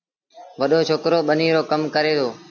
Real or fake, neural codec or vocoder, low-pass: real; none; 7.2 kHz